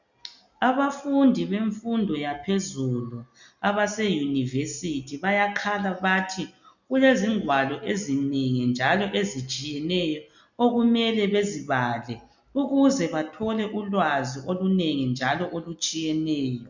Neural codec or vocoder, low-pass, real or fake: none; 7.2 kHz; real